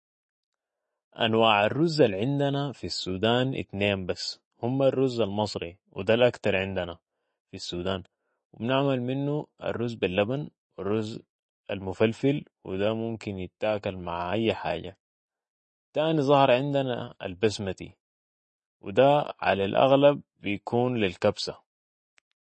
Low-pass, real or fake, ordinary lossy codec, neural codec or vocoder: 10.8 kHz; real; MP3, 32 kbps; none